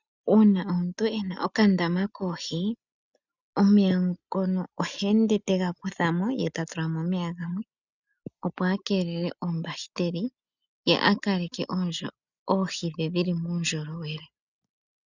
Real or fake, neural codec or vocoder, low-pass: real; none; 7.2 kHz